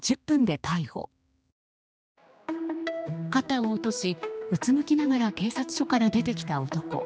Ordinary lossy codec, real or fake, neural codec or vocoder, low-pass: none; fake; codec, 16 kHz, 2 kbps, X-Codec, HuBERT features, trained on general audio; none